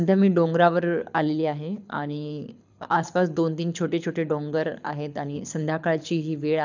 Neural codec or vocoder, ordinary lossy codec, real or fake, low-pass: codec, 24 kHz, 6 kbps, HILCodec; none; fake; 7.2 kHz